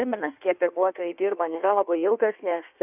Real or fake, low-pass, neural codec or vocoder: fake; 3.6 kHz; codec, 16 kHz in and 24 kHz out, 1.1 kbps, FireRedTTS-2 codec